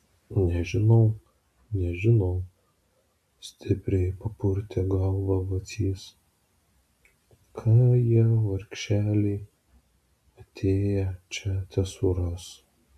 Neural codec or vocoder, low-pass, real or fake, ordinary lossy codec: none; 14.4 kHz; real; AAC, 96 kbps